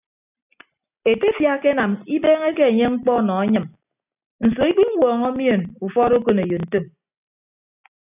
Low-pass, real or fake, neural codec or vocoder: 3.6 kHz; real; none